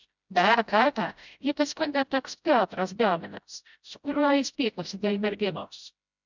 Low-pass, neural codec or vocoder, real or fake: 7.2 kHz; codec, 16 kHz, 0.5 kbps, FreqCodec, smaller model; fake